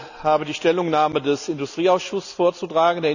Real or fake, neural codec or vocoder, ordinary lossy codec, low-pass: real; none; none; 7.2 kHz